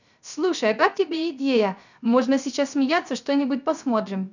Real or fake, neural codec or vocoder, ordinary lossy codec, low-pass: fake; codec, 16 kHz, 0.3 kbps, FocalCodec; none; 7.2 kHz